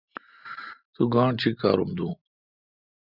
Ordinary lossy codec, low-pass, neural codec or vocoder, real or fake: Opus, 64 kbps; 5.4 kHz; none; real